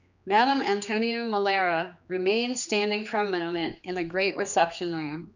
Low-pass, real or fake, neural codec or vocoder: 7.2 kHz; fake; codec, 16 kHz, 2 kbps, X-Codec, HuBERT features, trained on general audio